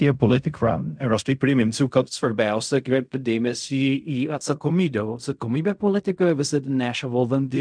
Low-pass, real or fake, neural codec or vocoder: 9.9 kHz; fake; codec, 16 kHz in and 24 kHz out, 0.4 kbps, LongCat-Audio-Codec, fine tuned four codebook decoder